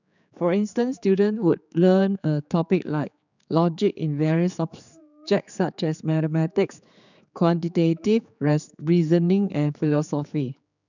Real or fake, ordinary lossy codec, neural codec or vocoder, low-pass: fake; none; codec, 16 kHz, 4 kbps, X-Codec, HuBERT features, trained on general audio; 7.2 kHz